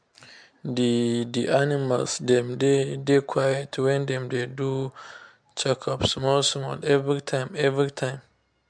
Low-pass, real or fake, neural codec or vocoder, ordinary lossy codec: 9.9 kHz; real; none; MP3, 64 kbps